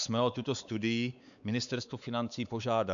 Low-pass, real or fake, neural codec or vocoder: 7.2 kHz; fake; codec, 16 kHz, 4 kbps, X-Codec, WavLM features, trained on Multilingual LibriSpeech